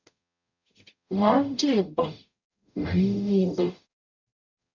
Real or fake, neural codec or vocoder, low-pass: fake; codec, 44.1 kHz, 0.9 kbps, DAC; 7.2 kHz